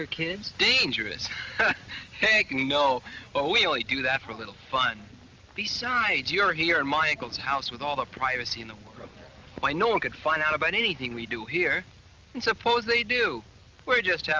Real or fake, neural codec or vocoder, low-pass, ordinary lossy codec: real; none; 7.2 kHz; Opus, 32 kbps